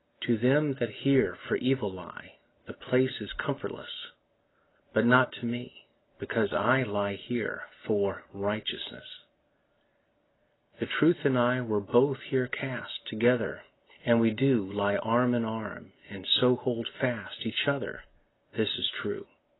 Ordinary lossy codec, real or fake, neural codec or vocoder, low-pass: AAC, 16 kbps; real; none; 7.2 kHz